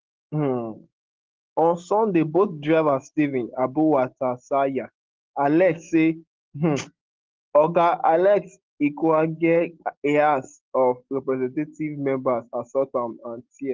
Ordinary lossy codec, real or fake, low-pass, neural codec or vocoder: Opus, 16 kbps; real; 7.2 kHz; none